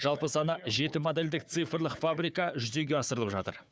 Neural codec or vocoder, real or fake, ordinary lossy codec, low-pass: codec, 16 kHz, 4 kbps, FunCodec, trained on Chinese and English, 50 frames a second; fake; none; none